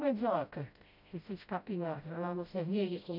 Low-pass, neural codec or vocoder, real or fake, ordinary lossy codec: 5.4 kHz; codec, 16 kHz, 0.5 kbps, FreqCodec, smaller model; fake; none